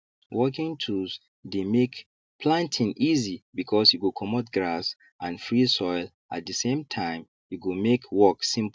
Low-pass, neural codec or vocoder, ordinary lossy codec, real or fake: none; none; none; real